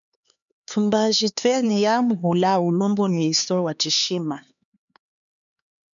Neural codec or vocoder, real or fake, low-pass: codec, 16 kHz, 4 kbps, X-Codec, HuBERT features, trained on LibriSpeech; fake; 7.2 kHz